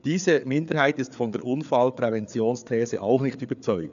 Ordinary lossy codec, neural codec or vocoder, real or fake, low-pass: none; codec, 16 kHz, 4 kbps, FreqCodec, larger model; fake; 7.2 kHz